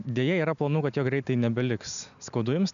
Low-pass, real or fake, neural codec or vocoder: 7.2 kHz; real; none